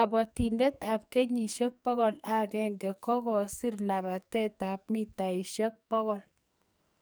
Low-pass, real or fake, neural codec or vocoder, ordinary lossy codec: none; fake; codec, 44.1 kHz, 2.6 kbps, SNAC; none